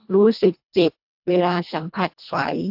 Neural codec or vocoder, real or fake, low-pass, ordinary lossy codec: codec, 24 kHz, 1.5 kbps, HILCodec; fake; 5.4 kHz; AAC, 48 kbps